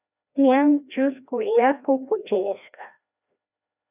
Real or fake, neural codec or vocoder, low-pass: fake; codec, 16 kHz, 1 kbps, FreqCodec, larger model; 3.6 kHz